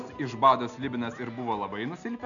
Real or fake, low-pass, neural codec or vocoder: real; 7.2 kHz; none